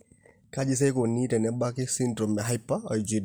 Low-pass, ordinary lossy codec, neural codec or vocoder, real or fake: none; none; none; real